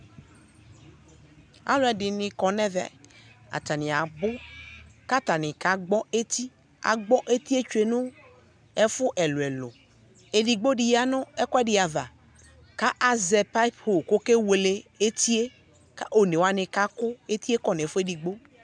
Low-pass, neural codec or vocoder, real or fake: 9.9 kHz; none; real